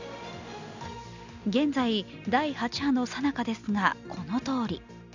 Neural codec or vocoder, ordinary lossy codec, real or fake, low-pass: none; none; real; 7.2 kHz